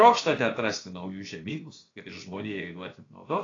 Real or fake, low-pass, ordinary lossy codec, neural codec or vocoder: fake; 7.2 kHz; AAC, 32 kbps; codec, 16 kHz, about 1 kbps, DyCAST, with the encoder's durations